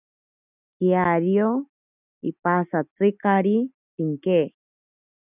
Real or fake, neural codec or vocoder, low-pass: real; none; 3.6 kHz